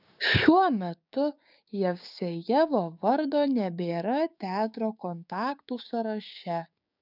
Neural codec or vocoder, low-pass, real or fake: codec, 16 kHz, 6 kbps, DAC; 5.4 kHz; fake